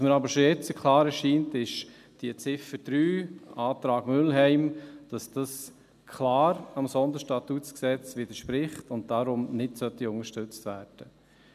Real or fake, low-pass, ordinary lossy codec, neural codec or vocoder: real; 14.4 kHz; none; none